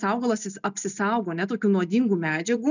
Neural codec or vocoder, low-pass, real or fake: none; 7.2 kHz; real